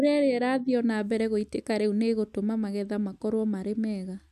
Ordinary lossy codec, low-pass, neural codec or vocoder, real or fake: none; 14.4 kHz; none; real